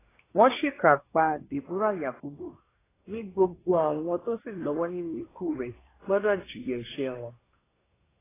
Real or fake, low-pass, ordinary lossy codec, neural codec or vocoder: fake; 3.6 kHz; AAC, 16 kbps; codec, 24 kHz, 1 kbps, SNAC